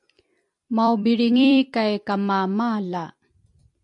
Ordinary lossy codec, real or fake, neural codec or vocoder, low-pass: AAC, 64 kbps; fake; vocoder, 44.1 kHz, 128 mel bands every 256 samples, BigVGAN v2; 10.8 kHz